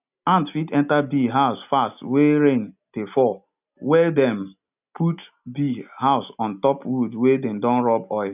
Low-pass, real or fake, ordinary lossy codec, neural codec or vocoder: 3.6 kHz; real; none; none